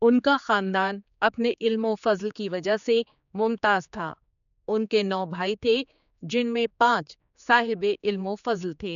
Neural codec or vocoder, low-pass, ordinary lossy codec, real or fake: codec, 16 kHz, 4 kbps, X-Codec, HuBERT features, trained on general audio; 7.2 kHz; none; fake